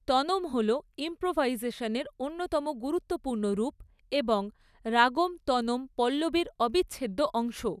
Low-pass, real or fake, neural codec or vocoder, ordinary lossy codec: 14.4 kHz; real; none; none